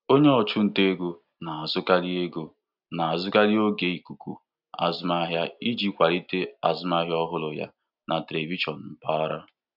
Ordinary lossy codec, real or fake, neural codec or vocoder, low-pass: none; real; none; 5.4 kHz